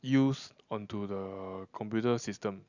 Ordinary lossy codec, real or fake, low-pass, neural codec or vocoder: none; real; 7.2 kHz; none